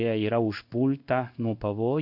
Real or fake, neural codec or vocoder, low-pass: fake; codec, 16 kHz in and 24 kHz out, 1 kbps, XY-Tokenizer; 5.4 kHz